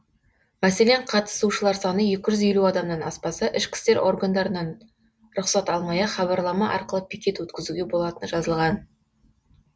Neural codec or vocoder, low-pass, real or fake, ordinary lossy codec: none; none; real; none